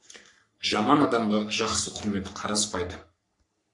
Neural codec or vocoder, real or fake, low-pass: codec, 44.1 kHz, 3.4 kbps, Pupu-Codec; fake; 10.8 kHz